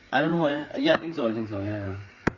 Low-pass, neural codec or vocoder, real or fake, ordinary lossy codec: 7.2 kHz; codec, 16 kHz, 4 kbps, FreqCodec, larger model; fake; Opus, 64 kbps